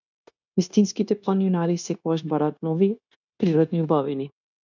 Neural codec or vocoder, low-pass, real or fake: codec, 16 kHz, 0.9 kbps, LongCat-Audio-Codec; 7.2 kHz; fake